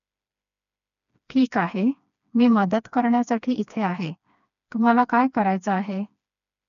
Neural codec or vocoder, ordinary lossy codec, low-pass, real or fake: codec, 16 kHz, 2 kbps, FreqCodec, smaller model; none; 7.2 kHz; fake